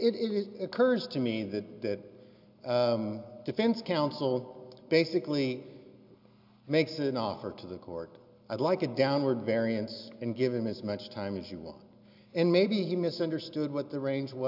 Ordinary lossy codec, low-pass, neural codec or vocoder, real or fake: AAC, 48 kbps; 5.4 kHz; none; real